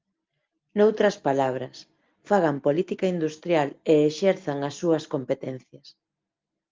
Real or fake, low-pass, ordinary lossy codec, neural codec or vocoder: real; 7.2 kHz; Opus, 32 kbps; none